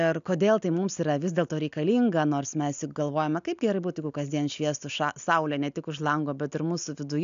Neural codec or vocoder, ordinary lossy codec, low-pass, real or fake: none; AAC, 96 kbps; 7.2 kHz; real